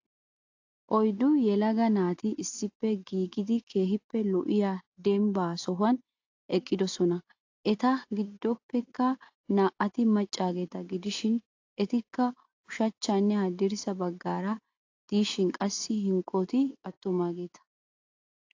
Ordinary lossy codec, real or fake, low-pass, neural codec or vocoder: AAC, 48 kbps; real; 7.2 kHz; none